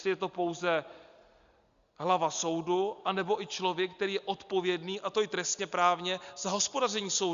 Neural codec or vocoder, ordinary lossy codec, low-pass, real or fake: none; Opus, 64 kbps; 7.2 kHz; real